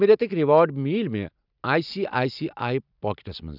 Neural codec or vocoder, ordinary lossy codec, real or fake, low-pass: none; none; real; 5.4 kHz